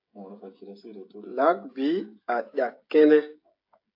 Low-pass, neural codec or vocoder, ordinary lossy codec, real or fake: 5.4 kHz; codec, 16 kHz, 16 kbps, FreqCodec, smaller model; AAC, 32 kbps; fake